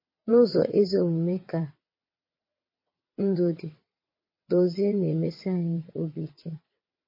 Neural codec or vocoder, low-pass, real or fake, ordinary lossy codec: vocoder, 22.05 kHz, 80 mel bands, WaveNeXt; 5.4 kHz; fake; MP3, 24 kbps